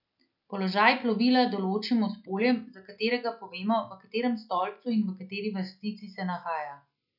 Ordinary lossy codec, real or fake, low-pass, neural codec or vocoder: none; real; 5.4 kHz; none